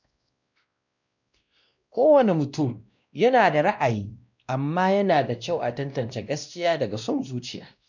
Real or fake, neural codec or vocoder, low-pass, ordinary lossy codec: fake; codec, 16 kHz, 1 kbps, X-Codec, WavLM features, trained on Multilingual LibriSpeech; 7.2 kHz; none